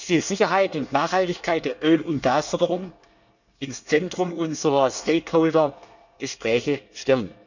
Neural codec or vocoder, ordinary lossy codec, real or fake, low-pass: codec, 24 kHz, 1 kbps, SNAC; none; fake; 7.2 kHz